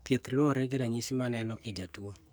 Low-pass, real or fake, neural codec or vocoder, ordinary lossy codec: none; fake; codec, 44.1 kHz, 2.6 kbps, SNAC; none